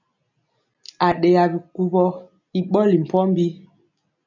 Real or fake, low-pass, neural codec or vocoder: real; 7.2 kHz; none